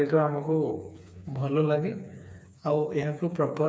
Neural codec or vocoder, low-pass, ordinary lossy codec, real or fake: codec, 16 kHz, 4 kbps, FreqCodec, smaller model; none; none; fake